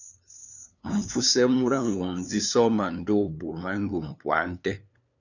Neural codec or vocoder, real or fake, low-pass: codec, 16 kHz, 2 kbps, FunCodec, trained on LibriTTS, 25 frames a second; fake; 7.2 kHz